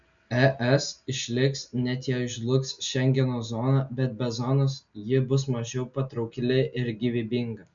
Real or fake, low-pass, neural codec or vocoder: real; 7.2 kHz; none